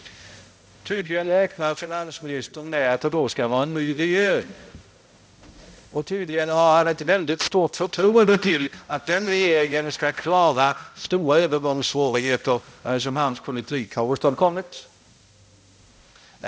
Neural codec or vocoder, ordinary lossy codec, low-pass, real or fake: codec, 16 kHz, 0.5 kbps, X-Codec, HuBERT features, trained on balanced general audio; none; none; fake